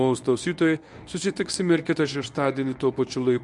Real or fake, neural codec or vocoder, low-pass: fake; codec, 24 kHz, 0.9 kbps, WavTokenizer, medium speech release version 1; 10.8 kHz